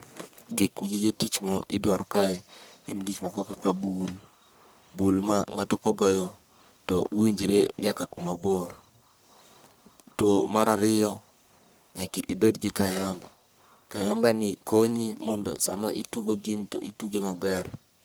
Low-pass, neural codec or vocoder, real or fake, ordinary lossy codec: none; codec, 44.1 kHz, 1.7 kbps, Pupu-Codec; fake; none